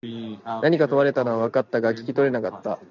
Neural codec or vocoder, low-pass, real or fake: none; 7.2 kHz; real